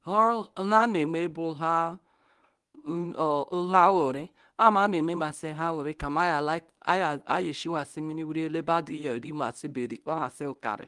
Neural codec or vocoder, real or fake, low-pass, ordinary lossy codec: codec, 24 kHz, 0.9 kbps, WavTokenizer, medium speech release version 1; fake; 10.8 kHz; Opus, 24 kbps